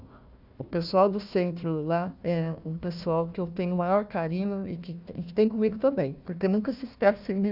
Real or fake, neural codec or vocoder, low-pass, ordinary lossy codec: fake; codec, 16 kHz, 1 kbps, FunCodec, trained on Chinese and English, 50 frames a second; 5.4 kHz; none